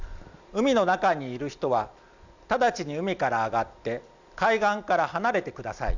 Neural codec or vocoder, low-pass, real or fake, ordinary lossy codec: none; 7.2 kHz; real; none